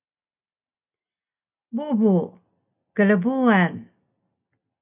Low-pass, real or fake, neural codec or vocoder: 3.6 kHz; real; none